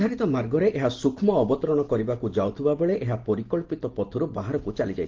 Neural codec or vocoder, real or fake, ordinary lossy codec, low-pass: none; real; Opus, 16 kbps; 7.2 kHz